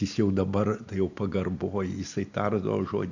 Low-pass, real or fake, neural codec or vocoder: 7.2 kHz; real; none